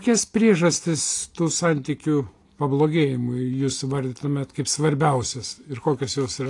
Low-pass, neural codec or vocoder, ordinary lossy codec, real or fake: 10.8 kHz; none; AAC, 48 kbps; real